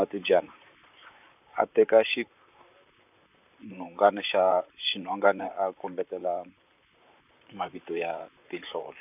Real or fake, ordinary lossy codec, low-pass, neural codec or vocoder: fake; none; 3.6 kHz; vocoder, 44.1 kHz, 128 mel bands, Pupu-Vocoder